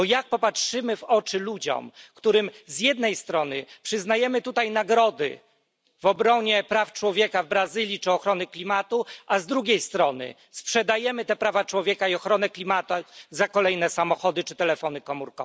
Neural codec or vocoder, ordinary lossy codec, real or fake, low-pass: none; none; real; none